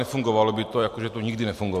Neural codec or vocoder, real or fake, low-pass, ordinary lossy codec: none; real; 14.4 kHz; MP3, 96 kbps